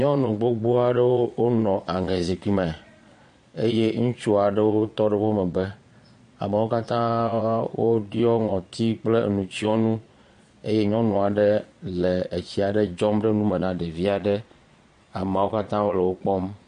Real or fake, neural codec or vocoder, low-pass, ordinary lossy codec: fake; vocoder, 22.05 kHz, 80 mel bands, Vocos; 9.9 kHz; MP3, 48 kbps